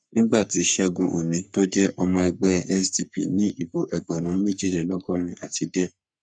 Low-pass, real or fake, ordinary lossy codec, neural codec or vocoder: 9.9 kHz; fake; none; codec, 44.1 kHz, 3.4 kbps, Pupu-Codec